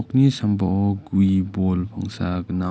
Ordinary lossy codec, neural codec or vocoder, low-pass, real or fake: none; none; none; real